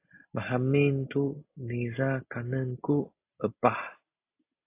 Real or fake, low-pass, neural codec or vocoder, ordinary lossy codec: real; 3.6 kHz; none; AAC, 24 kbps